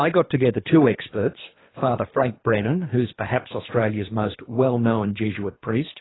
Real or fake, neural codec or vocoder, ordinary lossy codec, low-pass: fake; codec, 24 kHz, 3 kbps, HILCodec; AAC, 16 kbps; 7.2 kHz